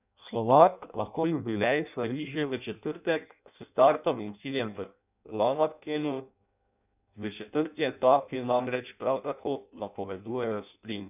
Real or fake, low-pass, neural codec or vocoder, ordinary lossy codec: fake; 3.6 kHz; codec, 16 kHz in and 24 kHz out, 0.6 kbps, FireRedTTS-2 codec; none